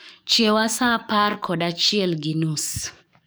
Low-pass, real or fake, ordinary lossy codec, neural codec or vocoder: none; fake; none; codec, 44.1 kHz, 7.8 kbps, DAC